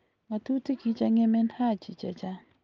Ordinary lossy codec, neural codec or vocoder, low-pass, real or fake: Opus, 24 kbps; none; 7.2 kHz; real